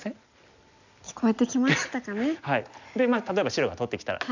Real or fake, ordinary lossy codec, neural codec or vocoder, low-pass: real; none; none; 7.2 kHz